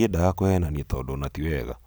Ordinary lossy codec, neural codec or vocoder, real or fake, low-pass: none; none; real; none